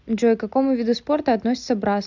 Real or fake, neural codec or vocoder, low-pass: real; none; 7.2 kHz